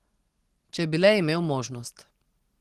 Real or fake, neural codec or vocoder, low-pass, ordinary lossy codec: real; none; 14.4 kHz; Opus, 16 kbps